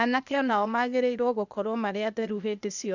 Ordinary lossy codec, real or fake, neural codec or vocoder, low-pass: none; fake; codec, 16 kHz, 0.8 kbps, ZipCodec; 7.2 kHz